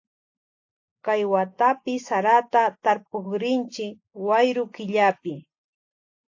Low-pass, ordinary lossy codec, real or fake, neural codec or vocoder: 7.2 kHz; AAC, 48 kbps; real; none